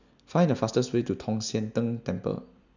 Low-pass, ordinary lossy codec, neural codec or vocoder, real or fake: 7.2 kHz; none; none; real